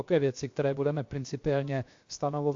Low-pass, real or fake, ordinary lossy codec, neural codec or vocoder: 7.2 kHz; fake; AAC, 48 kbps; codec, 16 kHz, 0.7 kbps, FocalCodec